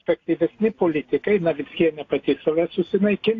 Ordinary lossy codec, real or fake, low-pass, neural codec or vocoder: AAC, 32 kbps; real; 7.2 kHz; none